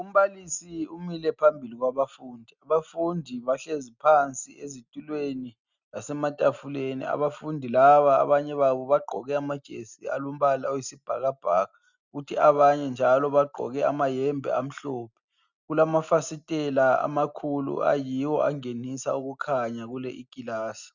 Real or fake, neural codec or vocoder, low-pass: real; none; 7.2 kHz